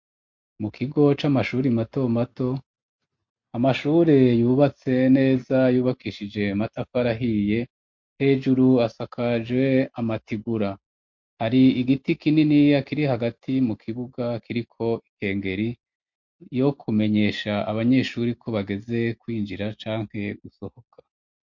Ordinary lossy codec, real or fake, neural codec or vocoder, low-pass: MP3, 48 kbps; real; none; 7.2 kHz